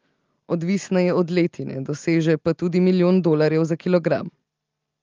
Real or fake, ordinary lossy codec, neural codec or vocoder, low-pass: real; Opus, 32 kbps; none; 7.2 kHz